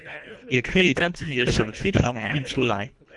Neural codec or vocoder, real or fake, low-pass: codec, 24 kHz, 1.5 kbps, HILCodec; fake; 10.8 kHz